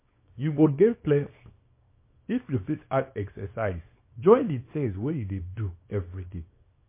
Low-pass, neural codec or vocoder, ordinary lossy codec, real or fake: 3.6 kHz; codec, 24 kHz, 0.9 kbps, WavTokenizer, small release; MP3, 24 kbps; fake